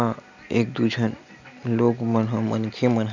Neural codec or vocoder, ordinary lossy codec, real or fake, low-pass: none; none; real; 7.2 kHz